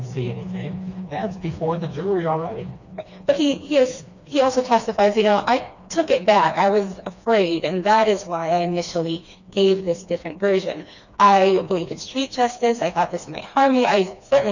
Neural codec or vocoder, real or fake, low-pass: codec, 16 kHz, 2 kbps, FreqCodec, smaller model; fake; 7.2 kHz